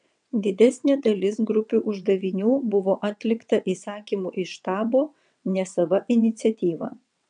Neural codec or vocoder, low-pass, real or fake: vocoder, 22.05 kHz, 80 mel bands, WaveNeXt; 9.9 kHz; fake